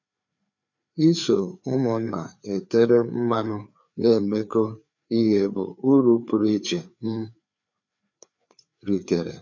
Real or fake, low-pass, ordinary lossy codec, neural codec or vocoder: fake; 7.2 kHz; none; codec, 16 kHz, 4 kbps, FreqCodec, larger model